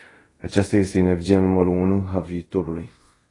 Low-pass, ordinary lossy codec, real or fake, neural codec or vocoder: 10.8 kHz; AAC, 32 kbps; fake; codec, 24 kHz, 0.5 kbps, DualCodec